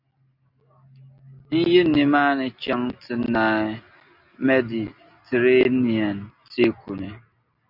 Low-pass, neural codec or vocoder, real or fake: 5.4 kHz; none; real